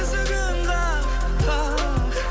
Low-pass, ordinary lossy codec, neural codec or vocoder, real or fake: none; none; none; real